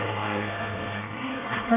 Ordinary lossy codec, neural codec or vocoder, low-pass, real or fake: none; codec, 24 kHz, 1 kbps, SNAC; 3.6 kHz; fake